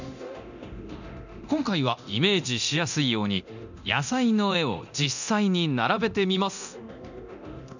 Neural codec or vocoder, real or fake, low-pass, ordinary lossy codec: codec, 24 kHz, 0.9 kbps, DualCodec; fake; 7.2 kHz; none